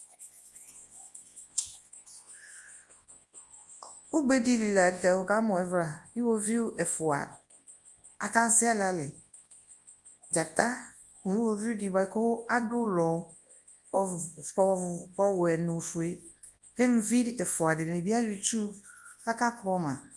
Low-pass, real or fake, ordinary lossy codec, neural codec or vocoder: 10.8 kHz; fake; Opus, 32 kbps; codec, 24 kHz, 0.9 kbps, WavTokenizer, large speech release